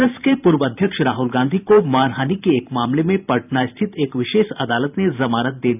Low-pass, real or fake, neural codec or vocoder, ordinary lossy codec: 3.6 kHz; fake; vocoder, 44.1 kHz, 128 mel bands every 512 samples, BigVGAN v2; none